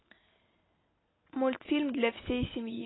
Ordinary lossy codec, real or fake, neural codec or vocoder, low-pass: AAC, 16 kbps; real; none; 7.2 kHz